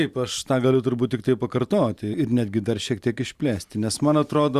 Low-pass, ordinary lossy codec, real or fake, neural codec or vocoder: 14.4 kHz; Opus, 64 kbps; fake; vocoder, 44.1 kHz, 128 mel bands every 512 samples, BigVGAN v2